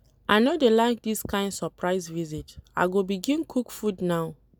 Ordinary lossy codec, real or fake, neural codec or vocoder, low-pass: none; real; none; none